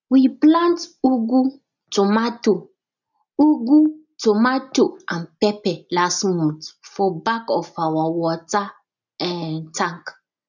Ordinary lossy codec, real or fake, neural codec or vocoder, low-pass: none; real; none; 7.2 kHz